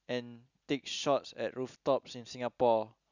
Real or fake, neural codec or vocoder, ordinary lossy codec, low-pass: real; none; none; 7.2 kHz